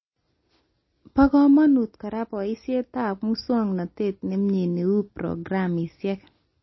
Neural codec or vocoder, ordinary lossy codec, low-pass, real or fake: none; MP3, 24 kbps; 7.2 kHz; real